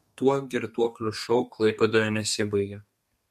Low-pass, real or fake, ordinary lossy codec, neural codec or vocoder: 14.4 kHz; fake; MP3, 64 kbps; codec, 32 kHz, 1.9 kbps, SNAC